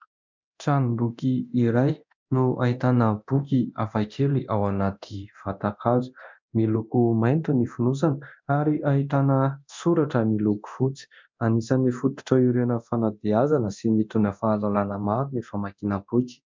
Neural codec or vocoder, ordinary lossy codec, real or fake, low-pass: codec, 24 kHz, 0.9 kbps, DualCodec; MP3, 64 kbps; fake; 7.2 kHz